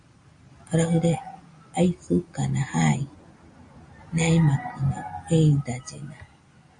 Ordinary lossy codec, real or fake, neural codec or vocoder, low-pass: MP3, 48 kbps; real; none; 9.9 kHz